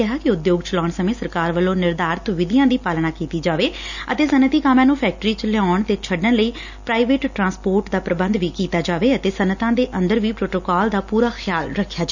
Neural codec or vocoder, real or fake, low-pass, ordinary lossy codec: none; real; 7.2 kHz; none